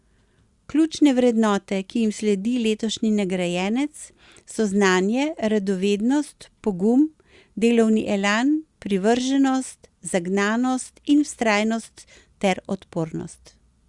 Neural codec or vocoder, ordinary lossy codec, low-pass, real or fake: none; Opus, 64 kbps; 10.8 kHz; real